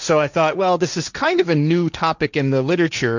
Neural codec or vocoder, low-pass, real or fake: codec, 16 kHz, 1.1 kbps, Voila-Tokenizer; 7.2 kHz; fake